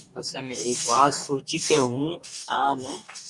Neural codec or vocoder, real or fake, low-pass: codec, 44.1 kHz, 2.6 kbps, DAC; fake; 10.8 kHz